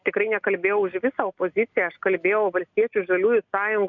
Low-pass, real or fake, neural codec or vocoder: 7.2 kHz; real; none